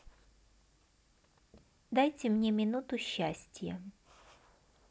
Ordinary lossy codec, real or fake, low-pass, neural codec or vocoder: none; real; none; none